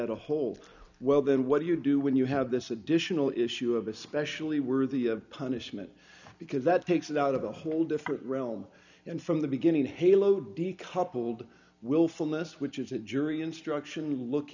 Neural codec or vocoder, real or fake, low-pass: none; real; 7.2 kHz